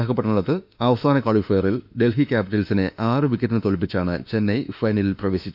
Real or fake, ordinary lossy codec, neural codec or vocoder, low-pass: fake; none; autoencoder, 48 kHz, 32 numbers a frame, DAC-VAE, trained on Japanese speech; 5.4 kHz